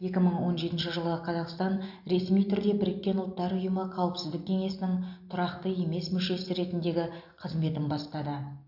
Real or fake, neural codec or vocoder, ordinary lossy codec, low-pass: real; none; AAC, 48 kbps; 5.4 kHz